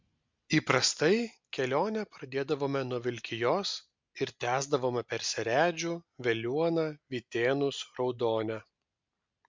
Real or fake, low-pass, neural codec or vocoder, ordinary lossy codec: real; 7.2 kHz; none; MP3, 64 kbps